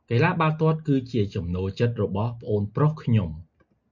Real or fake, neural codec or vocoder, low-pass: real; none; 7.2 kHz